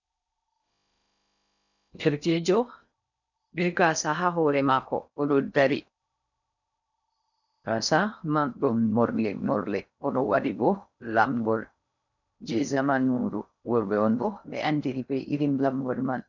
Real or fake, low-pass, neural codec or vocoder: fake; 7.2 kHz; codec, 16 kHz in and 24 kHz out, 0.6 kbps, FocalCodec, streaming, 4096 codes